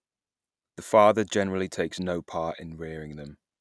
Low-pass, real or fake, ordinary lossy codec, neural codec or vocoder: none; real; none; none